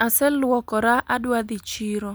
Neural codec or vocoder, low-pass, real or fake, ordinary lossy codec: none; none; real; none